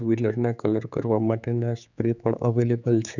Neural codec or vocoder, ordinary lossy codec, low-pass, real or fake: codec, 16 kHz, 4 kbps, X-Codec, HuBERT features, trained on general audio; none; 7.2 kHz; fake